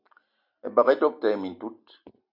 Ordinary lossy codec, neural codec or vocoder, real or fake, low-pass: AAC, 48 kbps; none; real; 5.4 kHz